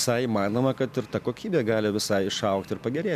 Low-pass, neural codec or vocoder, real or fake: 14.4 kHz; none; real